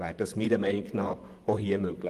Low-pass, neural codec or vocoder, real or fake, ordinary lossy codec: 14.4 kHz; vocoder, 44.1 kHz, 128 mel bands, Pupu-Vocoder; fake; Opus, 24 kbps